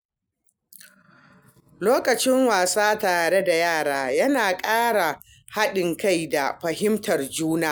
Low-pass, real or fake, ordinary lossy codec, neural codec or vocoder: none; real; none; none